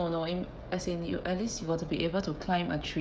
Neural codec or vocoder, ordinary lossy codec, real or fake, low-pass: codec, 16 kHz, 16 kbps, FreqCodec, smaller model; none; fake; none